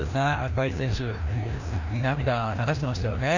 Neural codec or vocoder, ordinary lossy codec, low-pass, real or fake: codec, 16 kHz, 1 kbps, FreqCodec, larger model; none; 7.2 kHz; fake